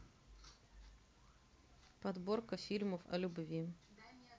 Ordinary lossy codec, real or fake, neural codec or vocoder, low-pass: none; real; none; none